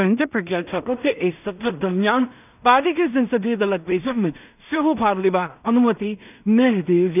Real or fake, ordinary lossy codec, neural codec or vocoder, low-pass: fake; none; codec, 16 kHz in and 24 kHz out, 0.4 kbps, LongCat-Audio-Codec, two codebook decoder; 3.6 kHz